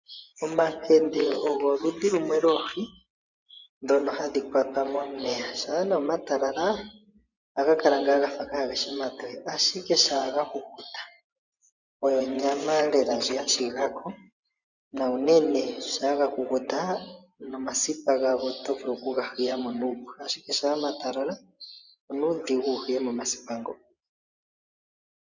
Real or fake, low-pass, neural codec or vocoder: fake; 7.2 kHz; vocoder, 24 kHz, 100 mel bands, Vocos